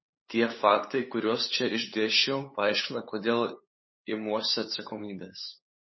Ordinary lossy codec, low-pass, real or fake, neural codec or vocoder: MP3, 24 kbps; 7.2 kHz; fake; codec, 16 kHz, 8 kbps, FunCodec, trained on LibriTTS, 25 frames a second